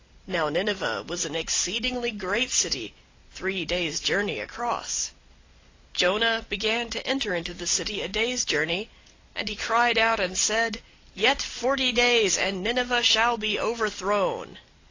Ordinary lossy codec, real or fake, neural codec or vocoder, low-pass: AAC, 32 kbps; real; none; 7.2 kHz